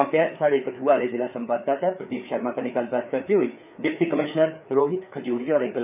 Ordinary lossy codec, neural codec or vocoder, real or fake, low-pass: none; codec, 16 kHz, 4 kbps, FreqCodec, larger model; fake; 3.6 kHz